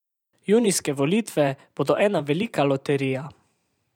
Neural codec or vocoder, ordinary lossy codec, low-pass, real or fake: vocoder, 44.1 kHz, 128 mel bands every 512 samples, BigVGAN v2; none; 19.8 kHz; fake